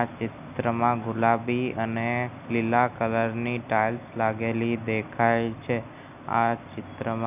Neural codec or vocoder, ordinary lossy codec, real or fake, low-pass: none; none; real; 3.6 kHz